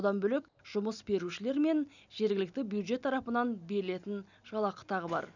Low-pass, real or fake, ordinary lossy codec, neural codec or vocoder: 7.2 kHz; real; none; none